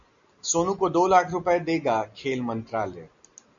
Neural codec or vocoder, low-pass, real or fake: none; 7.2 kHz; real